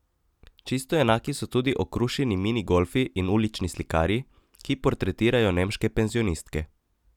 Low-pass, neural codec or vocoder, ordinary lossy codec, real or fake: 19.8 kHz; none; none; real